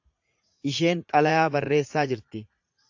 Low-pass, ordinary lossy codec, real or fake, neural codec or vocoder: 7.2 kHz; AAC, 48 kbps; fake; vocoder, 44.1 kHz, 80 mel bands, Vocos